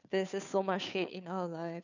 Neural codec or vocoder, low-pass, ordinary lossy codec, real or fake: codec, 16 kHz, 2 kbps, FunCodec, trained on Chinese and English, 25 frames a second; 7.2 kHz; none; fake